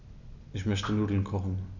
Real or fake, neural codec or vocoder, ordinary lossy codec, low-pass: real; none; none; 7.2 kHz